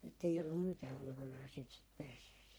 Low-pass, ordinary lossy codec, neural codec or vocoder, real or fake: none; none; codec, 44.1 kHz, 1.7 kbps, Pupu-Codec; fake